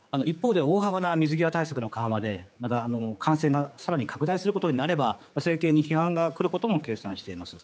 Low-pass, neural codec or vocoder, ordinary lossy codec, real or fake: none; codec, 16 kHz, 4 kbps, X-Codec, HuBERT features, trained on general audio; none; fake